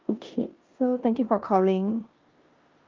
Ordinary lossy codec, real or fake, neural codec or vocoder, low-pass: Opus, 32 kbps; fake; codec, 16 kHz in and 24 kHz out, 0.9 kbps, LongCat-Audio-Codec, four codebook decoder; 7.2 kHz